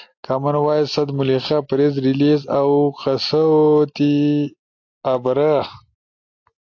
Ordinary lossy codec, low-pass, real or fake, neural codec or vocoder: AAC, 48 kbps; 7.2 kHz; real; none